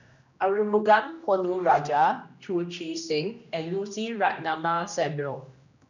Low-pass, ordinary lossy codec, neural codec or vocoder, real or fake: 7.2 kHz; none; codec, 16 kHz, 1 kbps, X-Codec, HuBERT features, trained on general audio; fake